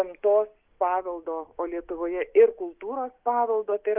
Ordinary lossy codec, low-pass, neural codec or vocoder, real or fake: Opus, 32 kbps; 3.6 kHz; none; real